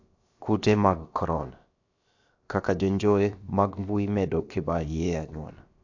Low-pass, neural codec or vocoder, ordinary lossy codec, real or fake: 7.2 kHz; codec, 16 kHz, about 1 kbps, DyCAST, with the encoder's durations; AAC, 48 kbps; fake